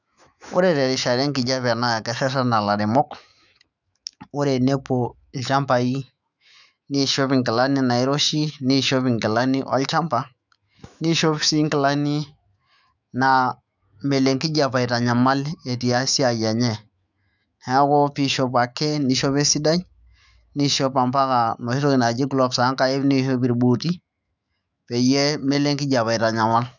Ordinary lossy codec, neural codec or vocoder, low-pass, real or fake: none; autoencoder, 48 kHz, 128 numbers a frame, DAC-VAE, trained on Japanese speech; 7.2 kHz; fake